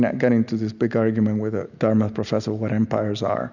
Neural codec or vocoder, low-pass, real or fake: none; 7.2 kHz; real